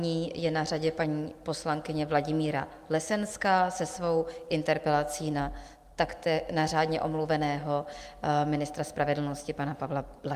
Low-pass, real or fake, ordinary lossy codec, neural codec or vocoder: 14.4 kHz; real; Opus, 32 kbps; none